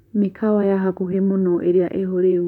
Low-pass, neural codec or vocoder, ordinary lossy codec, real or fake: 19.8 kHz; vocoder, 44.1 kHz, 128 mel bands, Pupu-Vocoder; none; fake